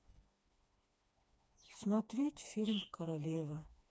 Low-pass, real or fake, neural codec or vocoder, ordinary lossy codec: none; fake; codec, 16 kHz, 2 kbps, FreqCodec, smaller model; none